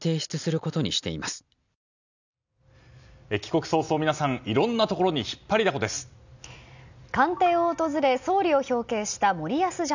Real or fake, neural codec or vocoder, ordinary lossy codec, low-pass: real; none; none; 7.2 kHz